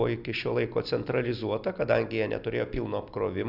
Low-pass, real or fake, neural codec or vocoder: 5.4 kHz; real; none